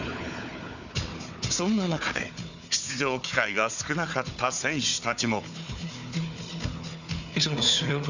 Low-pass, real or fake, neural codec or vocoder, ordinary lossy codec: 7.2 kHz; fake; codec, 16 kHz, 4 kbps, FunCodec, trained on Chinese and English, 50 frames a second; none